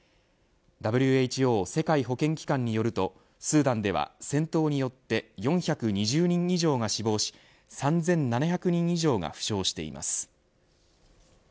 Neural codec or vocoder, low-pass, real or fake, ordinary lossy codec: none; none; real; none